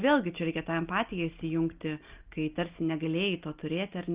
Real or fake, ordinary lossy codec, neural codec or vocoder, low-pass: real; Opus, 32 kbps; none; 3.6 kHz